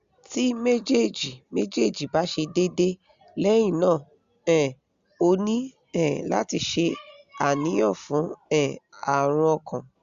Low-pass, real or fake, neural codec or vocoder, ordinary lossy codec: 7.2 kHz; real; none; Opus, 64 kbps